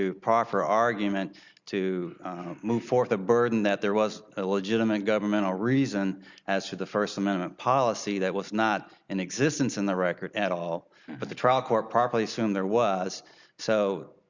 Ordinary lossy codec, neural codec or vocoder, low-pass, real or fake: Opus, 64 kbps; none; 7.2 kHz; real